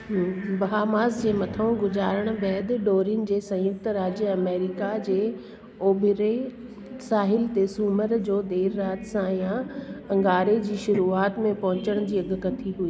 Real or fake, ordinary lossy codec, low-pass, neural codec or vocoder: real; none; none; none